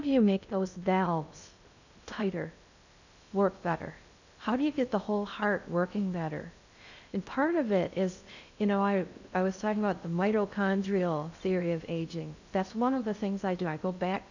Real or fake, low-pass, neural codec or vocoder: fake; 7.2 kHz; codec, 16 kHz in and 24 kHz out, 0.6 kbps, FocalCodec, streaming, 2048 codes